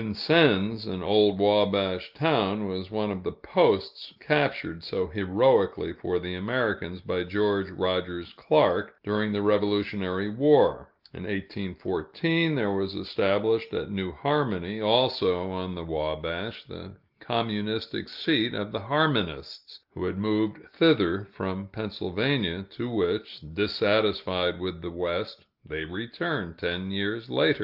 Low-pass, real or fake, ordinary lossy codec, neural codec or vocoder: 5.4 kHz; real; Opus, 32 kbps; none